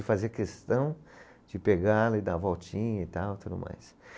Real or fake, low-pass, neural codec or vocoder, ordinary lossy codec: real; none; none; none